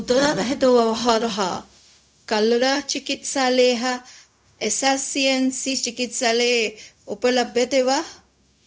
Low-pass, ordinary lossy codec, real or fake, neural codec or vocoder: none; none; fake; codec, 16 kHz, 0.4 kbps, LongCat-Audio-Codec